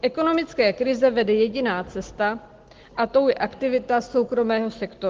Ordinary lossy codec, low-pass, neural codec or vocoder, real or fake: Opus, 16 kbps; 7.2 kHz; none; real